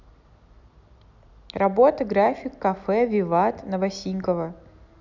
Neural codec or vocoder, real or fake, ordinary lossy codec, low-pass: none; real; none; 7.2 kHz